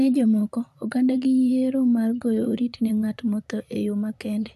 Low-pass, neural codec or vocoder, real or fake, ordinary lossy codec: 14.4 kHz; vocoder, 44.1 kHz, 128 mel bands, Pupu-Vocoder; fake; none